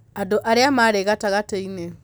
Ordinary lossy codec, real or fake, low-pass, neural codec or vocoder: none; real; none; none